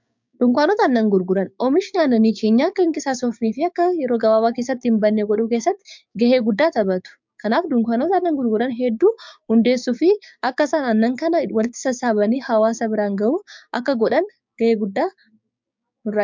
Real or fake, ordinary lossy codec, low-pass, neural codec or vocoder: fake; MP3, 64 kbps; 7.2 kHz; codec, 16 kHz, 6 kbps, DAC